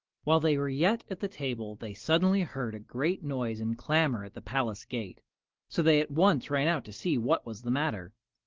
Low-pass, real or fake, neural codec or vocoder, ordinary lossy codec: 7.2 kHz; real; none; Opus, 16 kbps